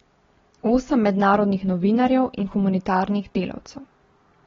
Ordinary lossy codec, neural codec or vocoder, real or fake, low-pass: AAC, 24 kbps; none; real; 7.2 kHz